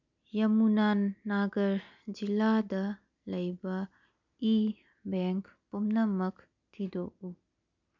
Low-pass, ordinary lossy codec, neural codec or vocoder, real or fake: 7.2 kHz; MP3, 64 kbps; none; real